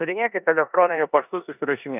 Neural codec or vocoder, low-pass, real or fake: codec, 16 kHz in and 24 kHz out, 0.9 kbps, LongCat-Audio-Codec, four codebook decoder; 3.6 kHz; fake